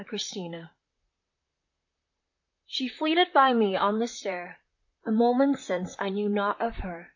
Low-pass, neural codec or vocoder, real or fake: 7.2 kHz; vocoder, 44.1 kHz, 128 mel bands, Pupu-Vocoder; fake